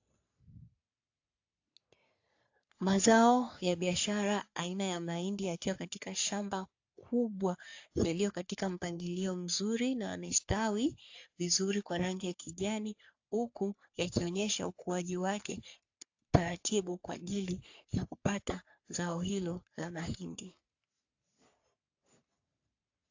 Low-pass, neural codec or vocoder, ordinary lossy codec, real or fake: 7.2 kHz; codec, 44.1 kHz, 3.4 kbps, Pupu-Codec; AAC, 48 kbps; fake